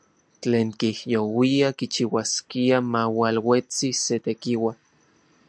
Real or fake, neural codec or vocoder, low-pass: real; none; 9.9 kHz